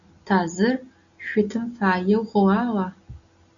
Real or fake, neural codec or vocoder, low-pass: real; none; 7.2 kHz